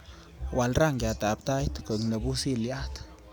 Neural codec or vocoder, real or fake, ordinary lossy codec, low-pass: none; real; none; none